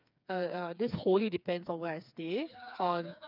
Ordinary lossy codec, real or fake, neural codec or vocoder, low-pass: none; fake; codec, 16 kHz, 4 kbps, FreqCodec, smaller model; 5.4 kHz